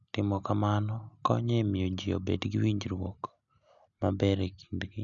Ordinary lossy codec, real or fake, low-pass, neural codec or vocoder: MP3, 96 kbps; real; 7.2 kHz; none